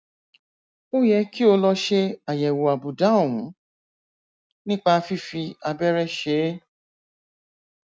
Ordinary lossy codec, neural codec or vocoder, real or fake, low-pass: none; none; real; none